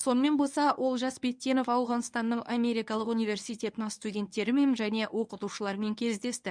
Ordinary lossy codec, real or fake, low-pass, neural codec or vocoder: none; fake; 9.9 kHz; codec, 24 kHz, 0.9 kbps, WavTokenizer, medium speech release version 1